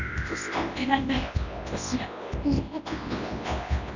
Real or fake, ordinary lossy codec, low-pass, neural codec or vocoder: fake; none; 7.2 kHz; codec, 24 kHz, 0.9 kbps, WavTokenizer, large speech release